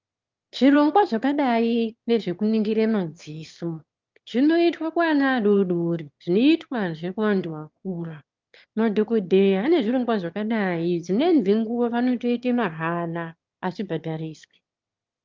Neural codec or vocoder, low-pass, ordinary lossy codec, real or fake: autoencoder, 22.05 kHz, a latent of 192 numbers a frame, VITS, trained on one speaker; 7.2 kHz; Opus, 24 kbps; fake